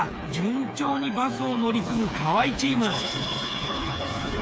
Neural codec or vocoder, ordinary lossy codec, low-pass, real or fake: codec, 16 kHz, 4 kbps, FreqCodec, smaller model; none; none; fake